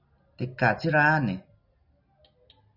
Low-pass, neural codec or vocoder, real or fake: 5.4 kHz; none; real